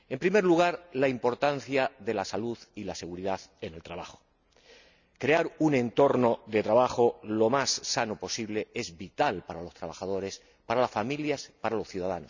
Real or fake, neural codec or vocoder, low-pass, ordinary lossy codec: real; none; 7.2 kHz; none